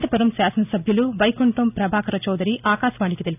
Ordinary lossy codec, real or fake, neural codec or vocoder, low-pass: none; real; none; 3.6 kHz